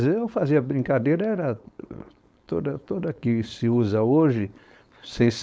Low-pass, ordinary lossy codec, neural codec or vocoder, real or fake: none; none; codec, 16 kHz, 4.8 kbps, FACodec; fake